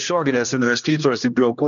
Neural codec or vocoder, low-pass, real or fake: codec, 16 kHz, 1 kbps, X-Codec, HuBERT features, trained on general audio; 7.2 kHz; fake